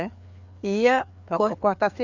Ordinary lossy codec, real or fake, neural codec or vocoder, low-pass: none; fake; codec, 16 kHz, 4 kbps, FreqCodec, larger model; 7.2 kHz